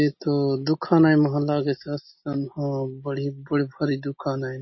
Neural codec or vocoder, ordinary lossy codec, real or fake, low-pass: none; MP3, 24 kbps; real; 7.2 kHz